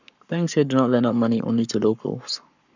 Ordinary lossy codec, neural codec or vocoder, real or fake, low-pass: none; codec, 44.1 kHz, 7.8 kbps, Pupu-Codec; fake; 7.2 kHz